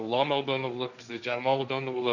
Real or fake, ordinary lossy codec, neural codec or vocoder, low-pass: fake; none; codec, 16 kHz, 1.1 kbps, Voila-Tokenizer; 7.2 kHz